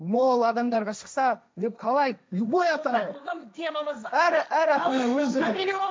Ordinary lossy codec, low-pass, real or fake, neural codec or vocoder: none; none; fake; codec, 16 kHz, 1.1 kbps, Voila-Tokenizer